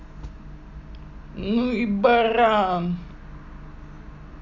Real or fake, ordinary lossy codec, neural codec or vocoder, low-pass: real; none; none; 7.2 kHz